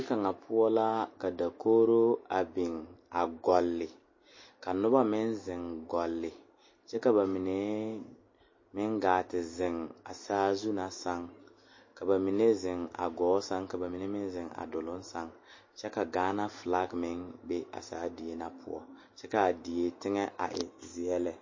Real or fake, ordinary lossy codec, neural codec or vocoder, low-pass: real; MP3, 32 kbps; none; 7.2 kHz